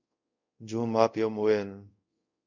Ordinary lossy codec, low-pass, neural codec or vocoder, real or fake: MP3, 64 kbps; 7.2 kHz; codec, 24 kHz, 0.5 kbps, DualCodec; fake